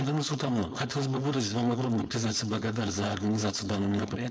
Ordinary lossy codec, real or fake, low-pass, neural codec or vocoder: none; fake; none; codec, 16 kHz, 4.8 kbps, FACodec